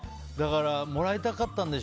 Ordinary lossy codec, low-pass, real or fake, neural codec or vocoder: none; none; real; none